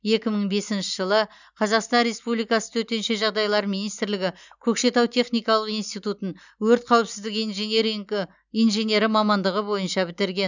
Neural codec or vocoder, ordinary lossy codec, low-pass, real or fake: none; none; 7.2 kHz; real